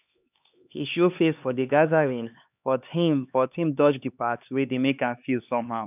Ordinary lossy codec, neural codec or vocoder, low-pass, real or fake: none; codec, 16 kHz, 2 kbps, X-Codec, HuBERT features, trained on LibriSpeech; 3.6 kHz; fake